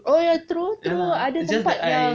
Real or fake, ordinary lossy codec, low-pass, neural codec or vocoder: real; none; none; none